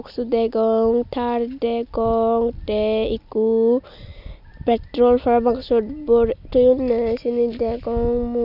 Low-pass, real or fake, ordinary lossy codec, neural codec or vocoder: 5.4 kHz; real; none; none